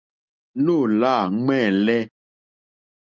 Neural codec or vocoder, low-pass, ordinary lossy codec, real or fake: none; 7.2 kHz; Opus, 24 kbps; real